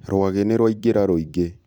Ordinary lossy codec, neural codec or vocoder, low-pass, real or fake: none; vocoder, 44.1 kHz, 128 mel bands every 256 samples, BigVGAN v2; 19.8 kHz; fake